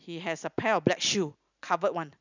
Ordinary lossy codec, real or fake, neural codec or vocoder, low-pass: none; real; none; 7.2 kHz